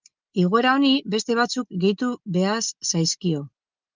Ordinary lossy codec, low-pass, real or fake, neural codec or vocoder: Opus, 24 kbps; 7.2 kHz; real; none